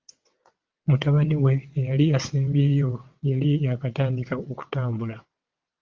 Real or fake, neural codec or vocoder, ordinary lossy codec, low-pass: fake; vocoder, 22.05 kHz, 80 mel bands, WaveNeXt; Opus, 32 kbps; 7.2 kHz